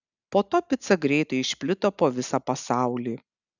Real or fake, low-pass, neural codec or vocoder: real; 7.2 kHz; none